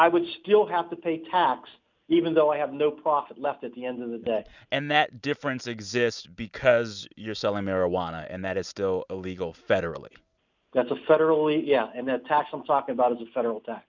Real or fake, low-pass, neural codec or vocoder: real; 7.2 kHz; none